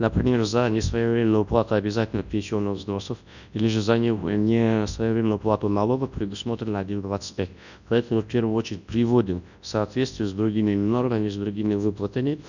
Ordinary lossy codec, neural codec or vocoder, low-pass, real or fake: none; codec, 24 kHz, 0.9 kbps, WavTokenizer, large speech release; 7.2 kHz; fake